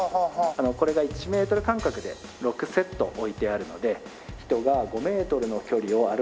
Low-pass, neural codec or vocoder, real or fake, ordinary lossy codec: none; none; real; none